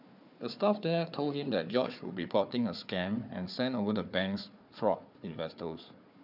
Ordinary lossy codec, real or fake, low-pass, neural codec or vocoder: none; fake; 5.4 kHz; codec, 16 kHz, 4 kbps, FunCodec, trained on Chinese and English, 50 frames a second